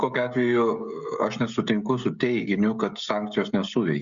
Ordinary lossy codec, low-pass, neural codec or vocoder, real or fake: Opus, 64 kbps; 7.2 kHz; codec, 16 kHz, 16 kbps, FreqCodec, smaller model; fake